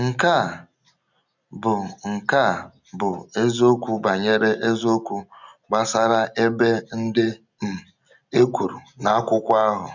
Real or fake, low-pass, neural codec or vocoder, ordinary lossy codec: real; 7.2 kHz; none; none